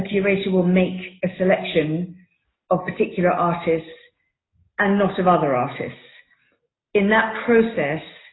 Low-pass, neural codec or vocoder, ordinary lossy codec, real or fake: 7.2 kHz; none; AAC, 16 kbps; real